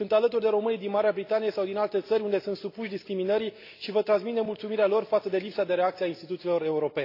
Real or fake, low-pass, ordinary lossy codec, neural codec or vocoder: real; 5.4 kHz; AAC, 32 kbps; none